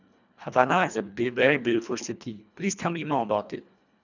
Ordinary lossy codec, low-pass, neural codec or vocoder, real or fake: none; 7.2 kHz; codec, 24 kHz, 1.5 kbps, HILCodec; fake